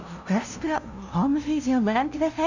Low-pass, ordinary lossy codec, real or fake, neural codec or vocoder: 7.2 kHz; none; fake; codec, 16 kHz, 0.5 kbps, FunCodec, trained on LibriTTS, 25 frames a second